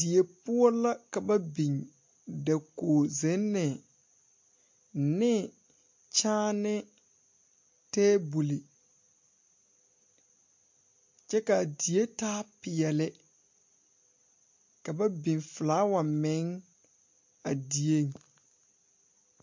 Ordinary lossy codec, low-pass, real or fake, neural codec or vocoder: MP3, 48 kbps; 7.2 kHz; real; none